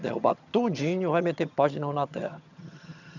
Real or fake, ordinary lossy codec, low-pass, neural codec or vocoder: fake; none; 7.2 kHz; vocoder, 22.05 kHz, 80 mel bands, HiFi-GAN